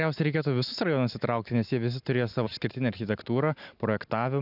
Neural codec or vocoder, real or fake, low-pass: none; real; 5.4 kHz